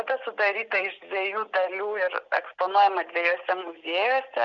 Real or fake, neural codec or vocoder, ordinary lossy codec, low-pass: real; none; Opus, 64 kbps; 7.2 kHz